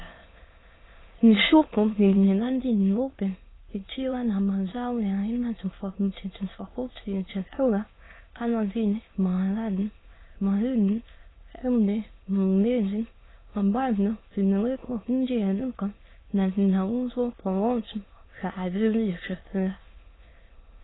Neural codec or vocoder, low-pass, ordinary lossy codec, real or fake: autoencoder, 22.05 kHz, a latent of 192 numbers a frame, VITS, trained on many speakers; 7.2 kHz; AAC, 16 kbps; fake